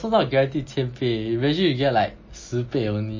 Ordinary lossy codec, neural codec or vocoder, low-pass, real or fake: MP3, 32 kbps; none; 7.2 kHz; real